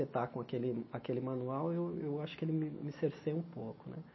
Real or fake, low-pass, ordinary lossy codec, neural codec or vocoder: real; 7.2 kHz; MP3, 24 kbps; none